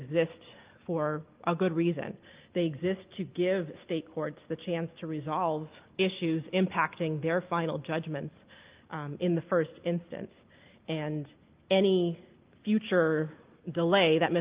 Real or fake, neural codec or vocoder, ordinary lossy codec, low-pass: real; none; Opus, 32 kbps; 3.6 kHz